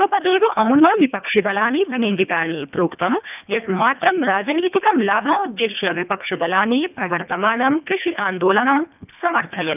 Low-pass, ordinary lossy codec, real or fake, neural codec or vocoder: 3.6 kHz; none; fake; codec, 24 kHz, 1.5 kbps, HILCodec